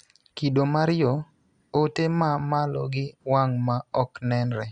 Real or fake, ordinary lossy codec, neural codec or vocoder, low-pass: real; Opus, 64 kbps; none; 9.9 kHz